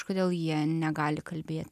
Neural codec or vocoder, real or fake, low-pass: none; real; 14.4 kHz